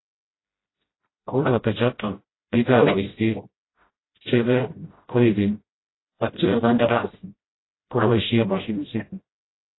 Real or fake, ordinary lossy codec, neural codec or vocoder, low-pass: fake; AAC, 16 kbps; codec, 16 kHz, 0.5 kbps, FreqCodec, smaller model; 7.2 kHz